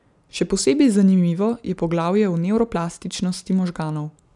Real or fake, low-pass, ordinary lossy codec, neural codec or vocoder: real; 10.8 kHz; none; none